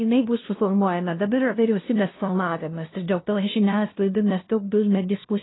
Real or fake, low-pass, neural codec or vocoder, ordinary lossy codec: fake; 7.2 kHz; codec, 16 kHz, 0.5 kbps, FunCodec, trained on LibriTTS, 25 frames a second; AAC, 16 kbps